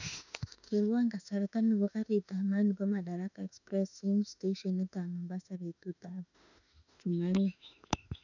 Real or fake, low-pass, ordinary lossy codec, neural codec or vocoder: fake; 7.2 kHz; none; autoencoder, 48 kHz, 32 numbers a frame, DAC-VAE, trained on Japanese speech